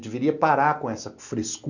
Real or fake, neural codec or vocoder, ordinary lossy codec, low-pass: real; none; none; 7.2 kHz